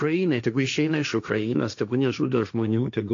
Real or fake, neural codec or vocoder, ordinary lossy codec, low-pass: fake; codec, 16 kHz, 1.1 kbps, Voila-Tokenizer; AAC, 64 kbps; 7.2 kHz